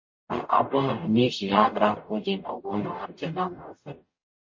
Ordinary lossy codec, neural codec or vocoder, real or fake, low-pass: MP3, 32 kbps; codec, 44.1 kHz, 0.9 kbps, DAC; fake; 7.2 kHz